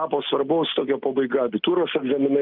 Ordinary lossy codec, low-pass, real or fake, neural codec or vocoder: Opus, 24 kbps; 5.4 kHz; real; none